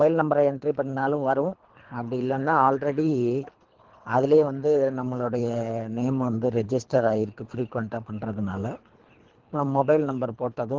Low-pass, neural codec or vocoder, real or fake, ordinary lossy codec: 7.2 kHz; codec, 24 kHz, 3 kbps, HILCodec; fake; Opus, 16 kbps